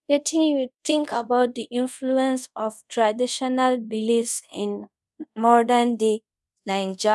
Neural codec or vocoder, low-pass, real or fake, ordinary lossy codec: codec, 24 kHz, 0.5 kbps, DualCodec; none; fake; none